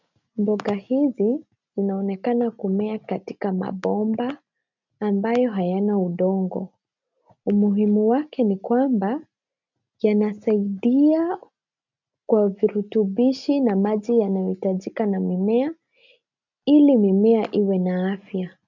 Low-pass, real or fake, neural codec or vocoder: 7.2 kHz; real; none